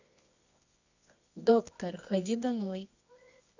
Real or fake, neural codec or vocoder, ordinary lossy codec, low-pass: fake; codec, 24 kHz, 0.9 kbps, WavTokenizer, medium music audio release; none; 7.2 kHz